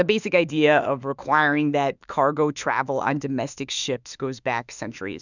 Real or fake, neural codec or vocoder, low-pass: fake; autoencoder, 48 kHz, 32 numbers a frame, DAC-VAE, trained on Japanese speech; 7.2 kHz